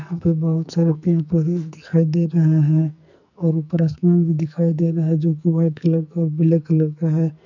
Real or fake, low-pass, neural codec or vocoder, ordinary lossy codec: fake; 7.2 kHz; codec, 44.1 kHz, 2.6 kbps, SNAC; none